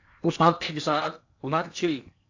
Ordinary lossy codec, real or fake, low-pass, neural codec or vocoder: AAC, 48 kbps; fake; 7.2 kHz; codec, 16 kHz in and 24 kHz out, 0.8 kbps, FocalCodec, streaming, 65536 codes